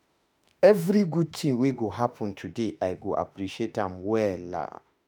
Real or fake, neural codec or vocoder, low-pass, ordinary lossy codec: fake; autoencoder, 48 kHz, 32 numbers a frame, DAC-VAE, trained on Japanese speech; none; none